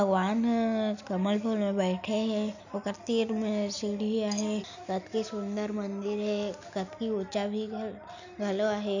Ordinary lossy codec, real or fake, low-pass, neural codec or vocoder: none; real; 7.2 kHz; none